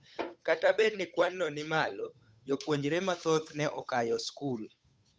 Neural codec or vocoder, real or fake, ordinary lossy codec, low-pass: codec, 16 kHz, 8 kbps, FunCodec, trained on Chinese and English, 25 frames a second; fake; none; none